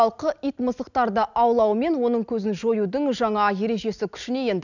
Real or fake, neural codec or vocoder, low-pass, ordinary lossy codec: real; none; 7.2 kHz; none